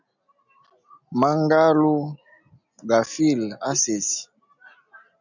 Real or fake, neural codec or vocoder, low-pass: real; none; 7.2 kHz